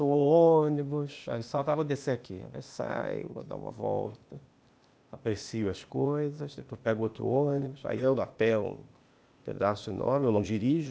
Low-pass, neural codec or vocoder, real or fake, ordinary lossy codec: none; codec, 16 kHz, 0.8 kbps, ZipCodec; fake; none